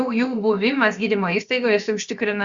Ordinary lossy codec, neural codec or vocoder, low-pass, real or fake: Opus, 64 kbps; codec, 16 kHz, about 1 kbps, DyCAST, with the encoder's durations; 7.2 kHz; fake